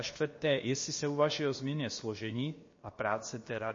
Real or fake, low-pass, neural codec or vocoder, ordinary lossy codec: fake; 7.2 kHz; codec, 16 kHz, about 1 kbps, DyCAST, with the encoder's durations; MP3, 32 kbps